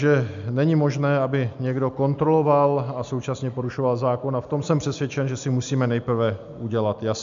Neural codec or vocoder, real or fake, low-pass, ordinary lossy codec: none; real; 7.2 kHz; MP3, 64 kbps